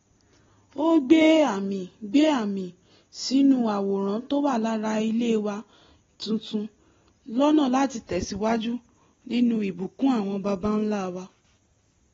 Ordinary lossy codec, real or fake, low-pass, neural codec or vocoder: AAC, 24 kbps; real; 7.2 kHz; none